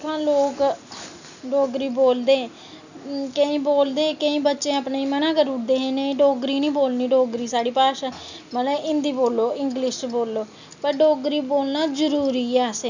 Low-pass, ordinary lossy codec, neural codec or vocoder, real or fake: 7.2 kHz; none; none; real